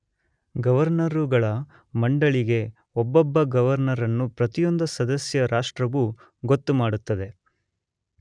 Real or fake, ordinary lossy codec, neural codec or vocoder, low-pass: real; none; none; 9.9 kHz